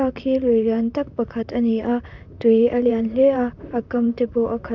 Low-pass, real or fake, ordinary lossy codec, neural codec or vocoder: 7.2 kHz; fake; none; vocoder, 44.1 kHz, 128 mel bands, Pupu-Vocoder